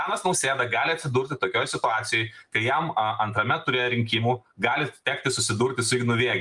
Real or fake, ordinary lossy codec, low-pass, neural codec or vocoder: real; Opus, 32 kbps; 10.8 kHz; none